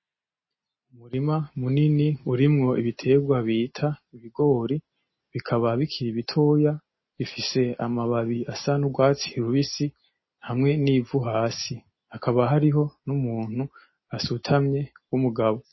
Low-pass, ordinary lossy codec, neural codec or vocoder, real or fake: 7.2 kHz; MP3, 24 kbps; none; real